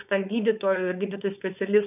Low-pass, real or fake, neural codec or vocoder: 3.6 kHz; fake; codec, 16 kHz, 4.8 kbps, FACodec